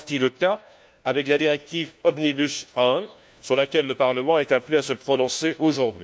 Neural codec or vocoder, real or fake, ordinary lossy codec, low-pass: codec, 16 kHz, 1 kbps, FunCodec, trained on LibriTTS, 50 frames a second; fake; none; none